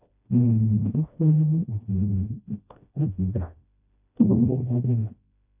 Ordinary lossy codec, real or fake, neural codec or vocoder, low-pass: none; fake; codec, 16 kHz, 1 kbps, FreqCodec, smaller model; 3.6 kHz